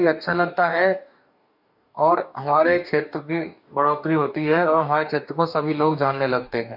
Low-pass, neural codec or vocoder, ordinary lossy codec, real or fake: 5.4 kHz; codec, 44.1 kHz, 2.6 kbps, DAC; none; fake